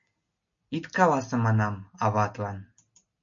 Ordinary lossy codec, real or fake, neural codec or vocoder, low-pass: MP3, 64 kbps; real; none; 7.2 kHz